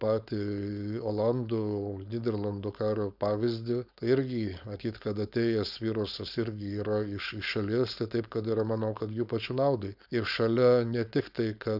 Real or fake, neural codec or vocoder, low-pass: fake; codec, 16 kHz, 4.8 kbps, FACodec; 5.4 kHz